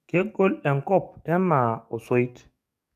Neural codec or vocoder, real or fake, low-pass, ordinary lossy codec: codec, 44.1 kHz, 7.8 kbps, DAC; fake; 14.4 kHz; none